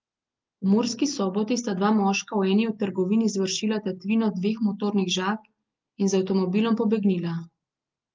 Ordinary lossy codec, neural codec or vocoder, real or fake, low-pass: Opus, 24 kbps; none; real; 7.2 kHz